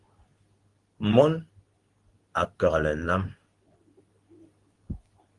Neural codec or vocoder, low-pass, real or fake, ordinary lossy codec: codec, 24 kHz, 0.9 kbps, WavTokenizer, medium speech release version 2; 10.8 kHz; fake; Opus, 24 kbps